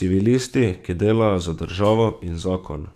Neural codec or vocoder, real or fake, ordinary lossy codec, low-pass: codec, 44.1 kHz, 7.8 kbps, DAC; fake; none; 14.4 kHz